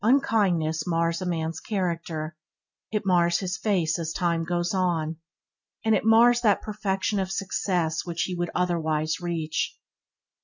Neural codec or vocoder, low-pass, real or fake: none; 7.2 kHz; real